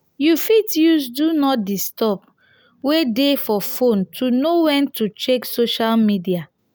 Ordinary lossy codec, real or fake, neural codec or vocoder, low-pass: none; real; none; none